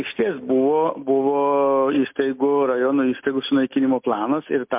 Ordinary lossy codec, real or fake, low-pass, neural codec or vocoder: MP3, 32 kbps; real; 3.6 kHz; none